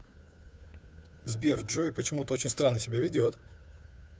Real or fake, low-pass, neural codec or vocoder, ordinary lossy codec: fake; none; codec, 16 kHz, 2 kbps, FunCodec, trained on Chinese and English, 25 frames a second; none